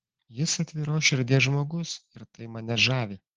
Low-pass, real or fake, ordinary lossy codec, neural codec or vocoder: 7.2 kHz; real; Opus, 16 kbps; none